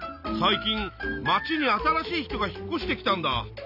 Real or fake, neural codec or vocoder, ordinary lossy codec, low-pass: real; none; none; 5.4 kHz